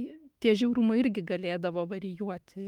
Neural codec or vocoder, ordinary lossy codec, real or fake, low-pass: autoencoder, 48 kHz, 32 numbers a frame, DAC-VAE, trained on Japanese speech; Opus, 32 kbps; fake; 19.8 kHz